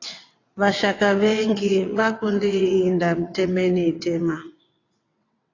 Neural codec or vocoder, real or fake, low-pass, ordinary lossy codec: vocoder, 22.05 kHz, 80 mel bands, WaveNeXt; fake; 7.2 kHz; AAC, 32 kbps